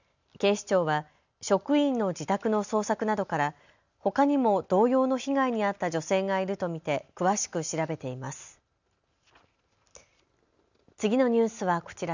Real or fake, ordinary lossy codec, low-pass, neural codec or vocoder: real; none; 7.2 kHz; none